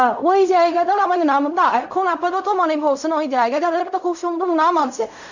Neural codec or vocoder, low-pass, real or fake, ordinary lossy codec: codec, 16 kHz in and 24 kHz out, 0.4 kbps, LongCat-Audio-Codec, fine tuned four codebook decoder; 7.2 kHz; fake; none